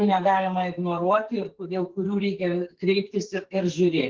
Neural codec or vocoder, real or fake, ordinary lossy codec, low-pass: codec, 44.1 kHz, 2.6 kbps, SNAC; fake; Opus, 16 kbps; 7.2 kHz